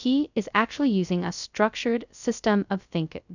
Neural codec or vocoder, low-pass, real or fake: codec, 16 kHz, 0.2 kbps, FocalCodec; 7.2 kHz; fake